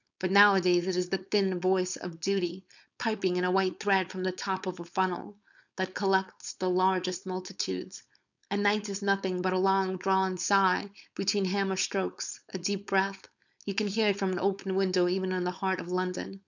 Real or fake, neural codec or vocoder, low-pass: fake; codec, 16 kHz, 4.8 kbps, FACodec; 7.2 kHz